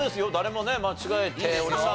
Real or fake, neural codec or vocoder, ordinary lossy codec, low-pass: real; none; none; none